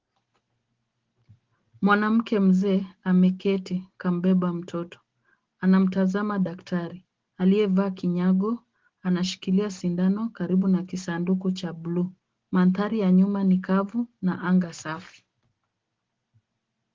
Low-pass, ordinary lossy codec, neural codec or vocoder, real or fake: 7.2 kHz; Opus, 16 kbps; none; real